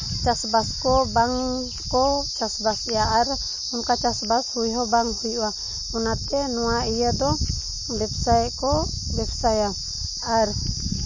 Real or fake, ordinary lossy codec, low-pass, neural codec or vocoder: real; MP3, 32 kbps; 7.2 kHz; none